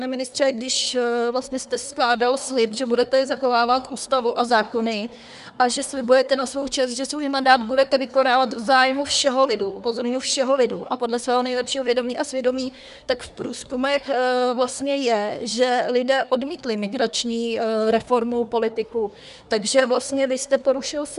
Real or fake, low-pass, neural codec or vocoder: fake; 10.8 kHz; codec, 24 kHz, 1 kbps, SNAC